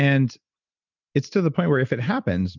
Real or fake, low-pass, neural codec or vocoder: real; 7.2 kHz; none